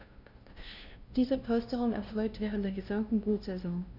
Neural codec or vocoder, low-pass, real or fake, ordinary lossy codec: codec, 16 kHz, 0.5 kbps, FunCodec, trained on LibriTTS, 25 frames a second; 5.4 kHz; fake; none